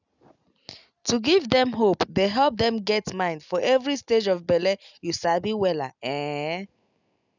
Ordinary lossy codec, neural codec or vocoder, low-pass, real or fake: none; none; 7.2 kHz; real